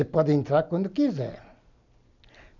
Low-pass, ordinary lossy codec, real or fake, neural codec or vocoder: 7.2 kHz; none; real; none